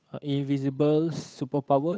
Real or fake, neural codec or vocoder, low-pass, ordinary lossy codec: fake; codec, 16 kHz, 8 kbps, FunCodec, trained on Chinese and English, 25 frames a second; none; none